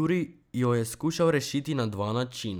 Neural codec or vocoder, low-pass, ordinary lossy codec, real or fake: none; none; none; real